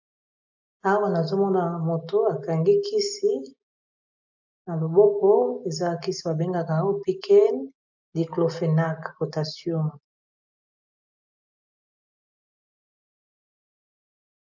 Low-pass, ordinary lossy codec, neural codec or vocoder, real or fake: 7.2 kHz; MP3, 64 kbps; none; real